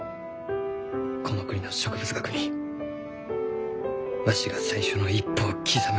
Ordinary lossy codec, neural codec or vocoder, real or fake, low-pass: none; none; real; none